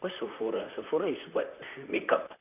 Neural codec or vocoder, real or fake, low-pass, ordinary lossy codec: vocoder, 44.1 kHz, 128 mel bands, Pupu-Vocoder; fake; 3.6 kHz; none